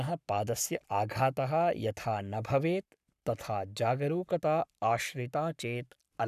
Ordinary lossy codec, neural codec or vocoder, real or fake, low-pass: none; codec, 44.1 kHz, 7.8 kbps, Pupu-Codec; fake; 14.4 kHz